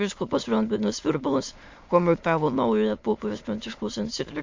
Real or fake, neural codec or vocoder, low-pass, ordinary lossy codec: fake; autoencoder, 22.05 kHz, a latent of 192 numbers a frame, VITS, trained on many speakers; 7.2 kHz; MP3, 48 kbps